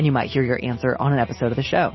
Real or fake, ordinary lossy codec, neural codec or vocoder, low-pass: real; MP3, 24 kbps; none; 7.2 kHz